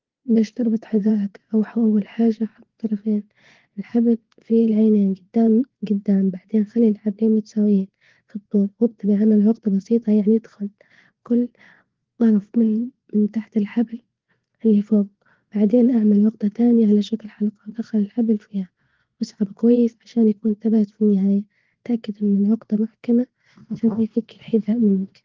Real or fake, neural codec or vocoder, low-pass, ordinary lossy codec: fake; vocoder, 24 kHz, 100 mel bands, Vocos; 7.2 kHz; Opus, 16 kbps